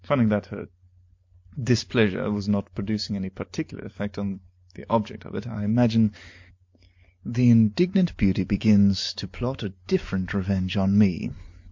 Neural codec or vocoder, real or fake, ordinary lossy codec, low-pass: none; real; MP3, 48 kbps; 7.2 kHz